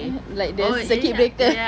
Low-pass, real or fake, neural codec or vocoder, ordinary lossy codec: none; real; none; none